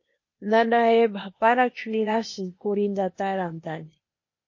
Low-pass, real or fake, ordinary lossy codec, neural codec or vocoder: 7.2 kHz; fake; MP3, 32 kbps; codec, 16 kHz, 0.8 kbps, ZipCodec